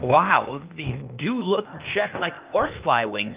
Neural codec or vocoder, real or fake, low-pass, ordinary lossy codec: codec, 16 kHz, 0.8 kbps, ZipCodec; fake; 3.6 kHz; Opus, 32 kbps